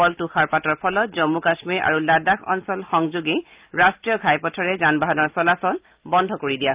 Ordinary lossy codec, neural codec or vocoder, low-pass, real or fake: Opus, 24 kbps; none; 3.6 kHz; real